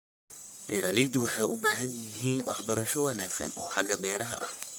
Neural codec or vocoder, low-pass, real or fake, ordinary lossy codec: codec, 44.1 kHz, 1.7 kbps, Pupu-Codec; none; fake; none